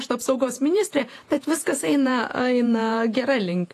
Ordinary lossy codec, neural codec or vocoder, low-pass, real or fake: AAC, 48 kbps; vocoder, 48 kHz, 128 mel bands, Vocos; 14.4 kHz; fake